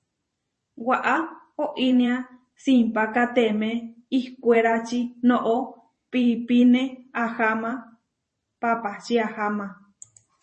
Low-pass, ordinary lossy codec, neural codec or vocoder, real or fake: 10.8 kHz; MP3, 32 kbps; vocoder, 44.1 kHz, 128 mel bands every 512 samples, BigVGAN v2; fake